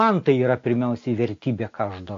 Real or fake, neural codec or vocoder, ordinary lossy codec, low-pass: real; none; AAC, 48 kbps; 7.2 kHz